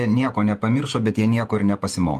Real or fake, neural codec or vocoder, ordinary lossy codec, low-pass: fake; vocoder, 48 kHz, 128 mel bands, Vocos; Opus, 32 kbps; 14.4 kHz